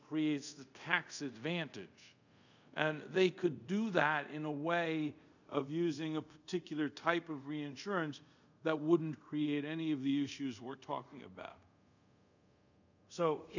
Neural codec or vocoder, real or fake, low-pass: codec, 24 kHz, 0.5 kbps, DualCodec; fake; 7.2 kHz